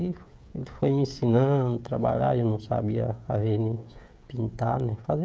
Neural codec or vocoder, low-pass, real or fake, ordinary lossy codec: codec, 16 kHz, 16 kbps, FreqCodec, smaller model; none; fake; none